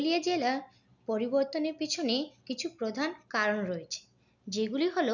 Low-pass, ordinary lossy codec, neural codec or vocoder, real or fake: 7.2 kHz; none; none; real